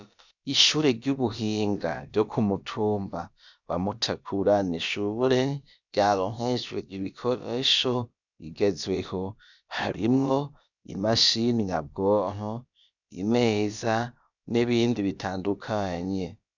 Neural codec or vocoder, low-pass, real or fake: codec, 16 kHz, about 1 kbps, DyCAST, with the encoder's durations; 7.2 kHz; fake